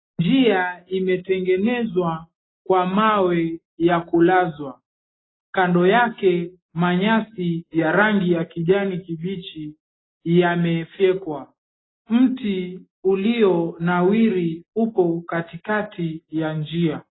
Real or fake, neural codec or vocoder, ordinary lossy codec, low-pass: real; none; AAC, 16 kbps; 7.2 kHz